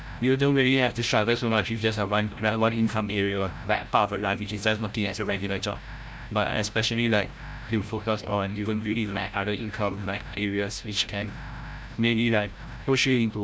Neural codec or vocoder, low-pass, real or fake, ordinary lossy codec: codec, 16 kHz, 0.5 kbps, FreqCodec, larger model; none; fake; none